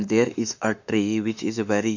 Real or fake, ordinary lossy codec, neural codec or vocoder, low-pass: fake; none; autoencoder, 48 kHz, 32 numbers a frame, DAC-VAE, trained on Japanese speech; 7.2 kHz